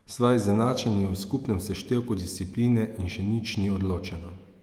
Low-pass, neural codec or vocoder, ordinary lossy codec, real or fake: 19.8 kHz; autoencoder, 48 kHz, 128 numbers a frame, DAC-VAE, trained on Japanese speech; Opus, 32 kbps; fake